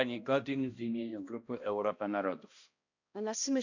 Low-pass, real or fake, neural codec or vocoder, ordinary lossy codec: 7.2 kHz; fake; codec, 16 kHz, 1 kbps, X-Codec, HuBERT features, trained on balanced general audio; none